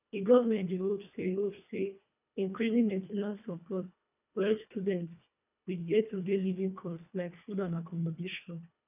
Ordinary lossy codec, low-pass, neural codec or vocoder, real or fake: none; 3.6 kHz; codec, 24 kHz, 1.5 kbps, HILCodec; fake